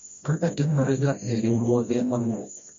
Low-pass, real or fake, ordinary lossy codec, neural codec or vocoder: 7.2 kHz; fake; MP3, 48 kbps; codec, 16 kHz, 1 kbps, FreqCodec, smaller model